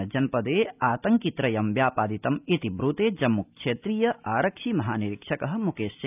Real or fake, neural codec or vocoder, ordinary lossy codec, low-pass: real; none; none; 3.6 kHz